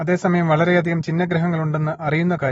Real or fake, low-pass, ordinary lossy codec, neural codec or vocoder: real; 19.8 kHz; AAC, 24 kbps; none